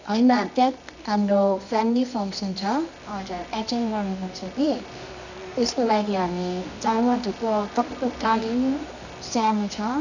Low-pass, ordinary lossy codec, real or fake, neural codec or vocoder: 7.2 kHz; none; fake; codec, 24 kHz, 0.9 kbps, WavTokenizer, medium music audio release